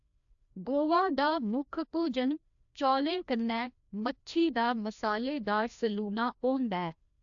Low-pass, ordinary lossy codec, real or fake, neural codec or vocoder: 7.2 kHz; AAC, 64 kbps; fake; codec, 16 kHz, 1 kbps, FreqCodec, larger model